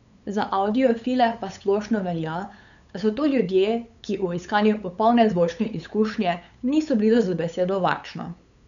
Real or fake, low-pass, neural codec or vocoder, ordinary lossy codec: fake; 7.2 kHz; codec, 16 kHz, 8 kbps, FunCodec, trained on LibriTTS, 25 frames a second; none